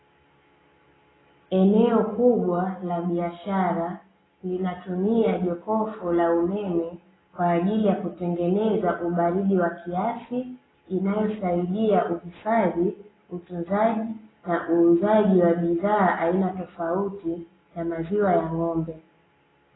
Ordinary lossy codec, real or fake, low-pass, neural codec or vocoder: AAC, 16 kbps; real; 7.2 kHz; none